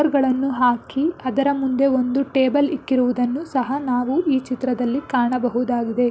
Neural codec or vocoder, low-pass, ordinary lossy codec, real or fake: none; none; none; real